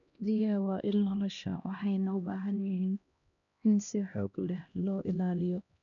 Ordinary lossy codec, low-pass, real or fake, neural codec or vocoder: none; 7.2 kHz; fake; codec, 16 kHz, 1 kbps, X-Codec, HuBERT features, trained on LibriSpeech